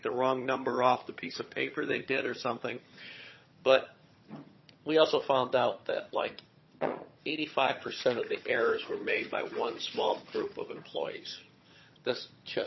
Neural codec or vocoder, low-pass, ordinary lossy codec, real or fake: vocoder, 22.05 kHz, 80 mel bands, HiFi-GAN; 7.2 kHz; MP3, 24 kbps; fake